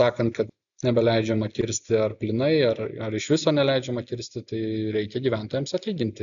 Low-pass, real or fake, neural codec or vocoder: 7.2 kHz; real; none